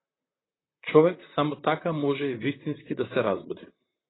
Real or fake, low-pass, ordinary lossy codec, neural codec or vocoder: fake; 7.2 kHz; AAC, 16 kbps; vocoder, 44.1 kHz, 128 mel bands, Pupu-Vocoder